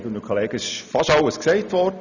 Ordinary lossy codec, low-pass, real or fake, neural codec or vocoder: none; 7.2 kHz; real; none